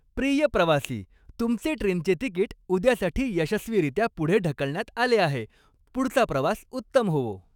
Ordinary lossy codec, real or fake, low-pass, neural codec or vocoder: none; fake; 19.8 kHz; autoencoder, 48 kHz, 128 numbers a frame, DAC-VAE, trained on Japanese speech